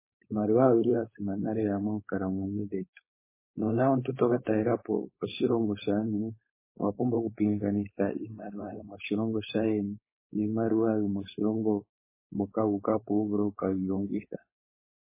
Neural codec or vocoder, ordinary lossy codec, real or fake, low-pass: codec, 16 kHz, 4.8 kbps, FACodec; MP3, 16 kbps; fake; 3.6 kHz